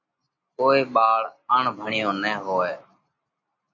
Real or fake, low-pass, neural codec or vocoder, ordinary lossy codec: real; 7.2 kHz; none; MP3, 48 kbps